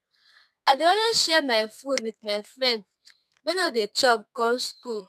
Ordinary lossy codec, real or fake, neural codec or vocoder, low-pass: none; fake; codec, 32 kHz, 1.9 kbps, SNAC; 14.4 kHz